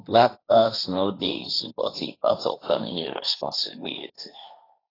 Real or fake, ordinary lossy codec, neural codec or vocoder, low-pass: fake; AAC, 24 kbps; codec, 16 kHz, 1.1 kbps, Voila-Tokenizer; 5.4 kHz